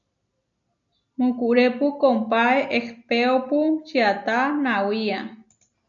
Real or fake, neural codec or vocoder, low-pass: real; none; 7.2 kHz